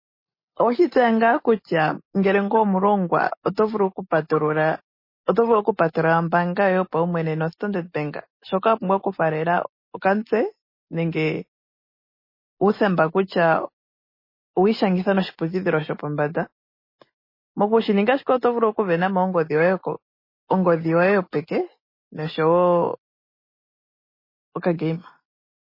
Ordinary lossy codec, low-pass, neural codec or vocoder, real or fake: MP3, 24 kbps; 5.4 kHz; none; real